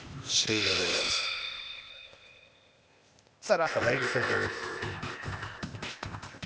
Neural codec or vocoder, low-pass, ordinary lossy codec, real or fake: codec, 16 kHz, 0.8 kbps, ZipCodec; none; none; fake